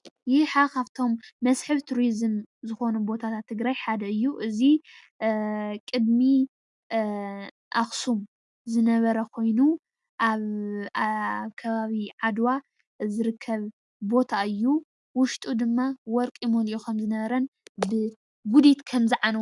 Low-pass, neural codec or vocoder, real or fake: 10.8 kHz; none; real